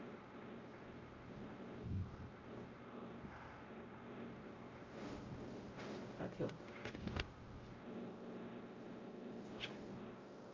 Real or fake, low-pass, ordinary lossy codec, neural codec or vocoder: fake; 7.2 kHz; Opus, 24 kbps; codec, 16 kHz, 0.5 kbps, X-Codec, WavLM features, trained on Multilingual LibriSpeech